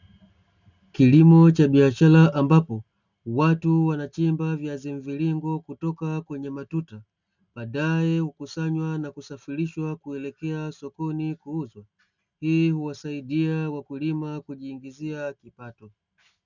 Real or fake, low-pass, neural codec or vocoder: real; 7.2 kHz; none